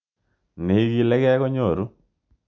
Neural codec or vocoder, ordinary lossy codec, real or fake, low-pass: none; none; real; 7.2 kHz